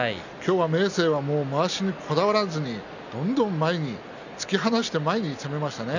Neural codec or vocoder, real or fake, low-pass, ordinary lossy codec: none; real; 7.2 kHz; none